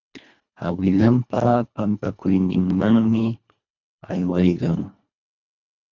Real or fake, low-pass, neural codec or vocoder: fake; 7.2 kHz; codec, 24 kHz, 1.5 kbps, HILCodec